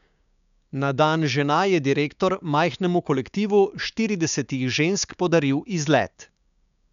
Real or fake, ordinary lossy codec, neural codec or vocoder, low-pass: fake; none; codec, 16 kHz, 6 kbps, DAC; 7.2 kHz